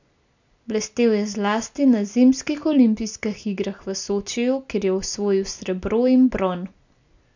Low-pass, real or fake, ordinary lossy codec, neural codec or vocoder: 7.2 kHz; real; none; none